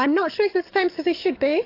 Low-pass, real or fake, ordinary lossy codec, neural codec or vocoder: 5.4 kHz; fake; AAC, 32 kbps; codec, 44.1 kHz, 7.8 kbps, DAC